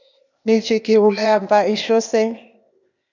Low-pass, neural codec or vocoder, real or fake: 7.2 kHz; codec, 16 kHz, 0.8 kbps, ZipCodec; fake